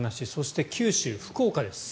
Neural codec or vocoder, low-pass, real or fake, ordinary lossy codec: none; none; real; none